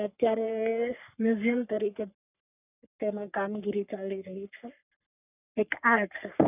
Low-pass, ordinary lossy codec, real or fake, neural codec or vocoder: 3.6 kHz; none; fake; codec, 44.1 kHz, 3.4 kbps, Pupu-Codec